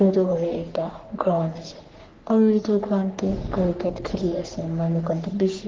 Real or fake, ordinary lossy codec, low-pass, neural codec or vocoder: fake; Opus, 16 kbps; 7.2 kHz; codec, 44.1 kHz, 3.4 kbps, Pupu-Codec